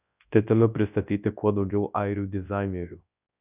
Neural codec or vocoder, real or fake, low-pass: codec, 24 kHz, 0.9 kbps, WavTokenizer, large speech release; fake; 3.6 kHz